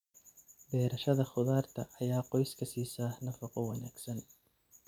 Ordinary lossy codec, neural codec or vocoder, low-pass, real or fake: none; none; 19.8 kHz; real